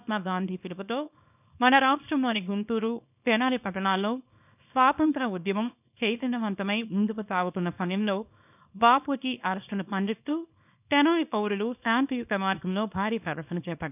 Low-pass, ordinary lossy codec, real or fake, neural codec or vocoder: 3.6 kHz; none; fake; codec, 24 kHz, 0.9 kbps, WavTokenizer, small release